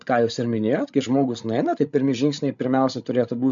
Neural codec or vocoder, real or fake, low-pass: codec, 16 kHz, 16 kbps, FreqCodec, larger model; fake; 7.2 kHz